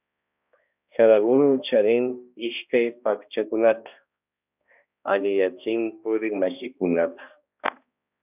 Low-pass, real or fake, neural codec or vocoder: 3.6 kHz; fake; codec, 16 kHz, 1 kbps, X-Codec, HuBERT features, trained on balanced general audio